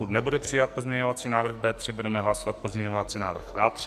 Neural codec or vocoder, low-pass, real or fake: codec, 44.1 kHz, 2.6 kbps, SNAC; 14.4 kHz; fake